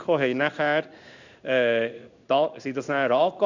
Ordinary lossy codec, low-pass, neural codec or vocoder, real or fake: none; 7.2 kHz; codec, 16 kHz in and 24 kHz out, 1 kbps, XY-Tokenizer; fake